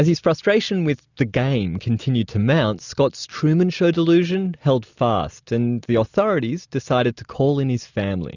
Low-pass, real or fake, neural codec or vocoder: 7.2 kHz; real; none